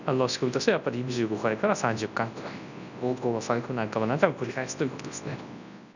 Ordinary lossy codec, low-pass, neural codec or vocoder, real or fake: none; 7.2 kHz; codec, 24 kHz, 0.9 kbps, WavTokenizer, large speech release; fake